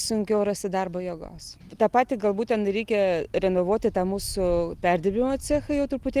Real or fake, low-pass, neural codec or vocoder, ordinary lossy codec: real; 14.4 kHz; none; Opus, 24 kbps